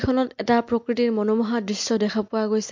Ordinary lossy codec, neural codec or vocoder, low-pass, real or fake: MP3, 48 kbps; none; 7.2 kHz; real